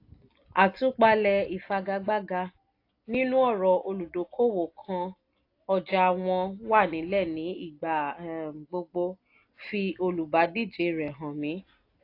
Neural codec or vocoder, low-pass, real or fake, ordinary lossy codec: none; 5.4 kHz; real; AAC, 32 kbps